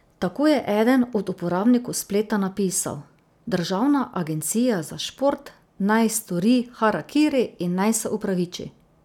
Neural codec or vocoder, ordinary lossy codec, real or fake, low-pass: none; none; real; 19.8 kHz